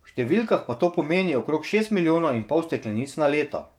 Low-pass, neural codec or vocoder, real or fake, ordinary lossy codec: 19.8 kHz; codec, 44.1 kHz, 7.8 kbps, DAC; fake; MP3, 96 kbps